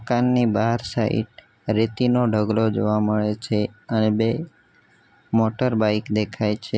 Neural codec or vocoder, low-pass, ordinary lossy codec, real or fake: none; none; none; real